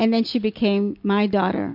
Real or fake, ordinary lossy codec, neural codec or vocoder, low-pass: real; MP3, 48 kbps; none; 5.4 kHz